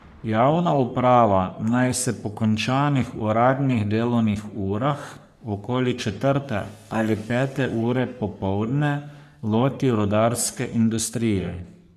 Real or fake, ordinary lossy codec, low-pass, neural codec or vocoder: fake; none; 14.4 kHz; codec, 44.1 kHz, 3.4 kbps, Pupu-Codec